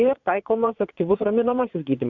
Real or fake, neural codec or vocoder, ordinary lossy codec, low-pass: fake; vocoder, 22.05 kHz, 80 mel bands, Vocos; Opus, 64 kbps; 7.2 kHz